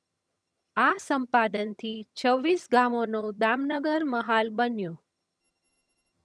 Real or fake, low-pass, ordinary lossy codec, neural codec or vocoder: fake; none; none; vocoder, 22.05 kHz, 80 mel bands, HiFi-GAN